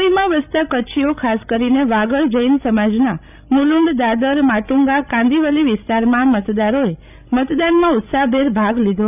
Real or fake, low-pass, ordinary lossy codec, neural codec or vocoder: fake; 3.6 kHz; none; codec, 16 kHz, 16 kbps, FreqCodec, larger model